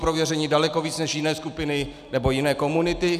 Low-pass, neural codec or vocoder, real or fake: 14.4 kHz; none; real